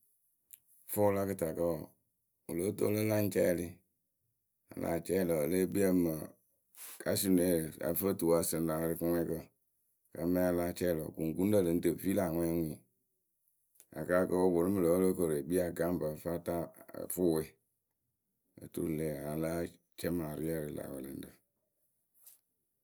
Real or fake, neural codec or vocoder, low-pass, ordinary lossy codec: real; none; none; none